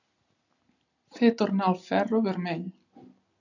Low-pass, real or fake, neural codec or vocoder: 7.2 kHz; real; none